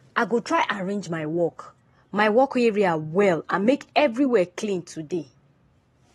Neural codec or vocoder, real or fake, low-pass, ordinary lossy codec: none; real; 19.8 kHz; AAC, 32 kbps